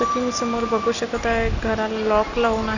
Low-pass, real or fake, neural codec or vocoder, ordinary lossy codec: 7.2 kHz; real; none; none